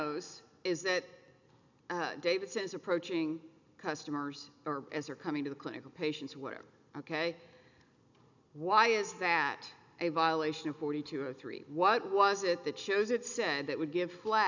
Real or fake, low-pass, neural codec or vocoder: real; 7.2 kHz; none